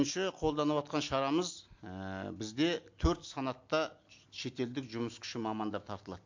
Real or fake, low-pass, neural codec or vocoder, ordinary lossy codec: real; 7.2 kHz; none; MP3, 48 kbps